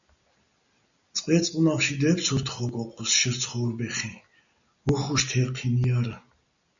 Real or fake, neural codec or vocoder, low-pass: real; none; 7.2 kHz